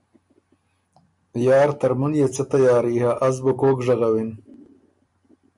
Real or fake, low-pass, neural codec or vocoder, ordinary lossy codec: real; 10.8 kHz; none; MP3, 96 kbps